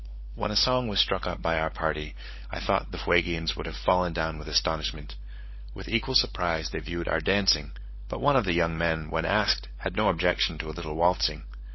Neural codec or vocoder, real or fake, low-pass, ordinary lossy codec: codec, 16 kHz, 16 kbps, FunCodec, trained on LibriTTS, 50 frames a second; fake; 7.2 kHz; MP3, 24 kbps